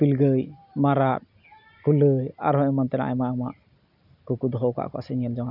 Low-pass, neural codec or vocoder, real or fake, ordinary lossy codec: 5.4 kHz; none; real; none